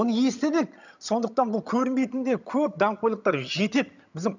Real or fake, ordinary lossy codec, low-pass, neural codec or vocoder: fake; none; 7.2 kHz; vocoder, 22.05 kHz, 80 mel bands, HiFi-GAN